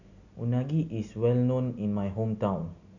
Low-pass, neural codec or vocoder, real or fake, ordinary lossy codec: 7.2 kHz; none; real; none